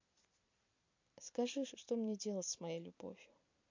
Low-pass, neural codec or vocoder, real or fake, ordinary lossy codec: 7.2 kHz; vocoder, 44.1 kHz, 128 mel bands every 256 samples, BigVGAN v2; fake; MP3, 48 kbps